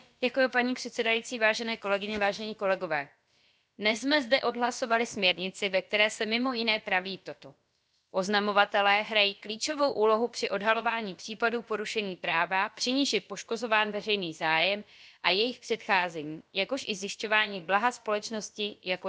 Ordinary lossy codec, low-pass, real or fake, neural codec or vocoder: none; none; fake; codec, 16 kHz, about 1 kbps, DyCAST, with the encoder's durations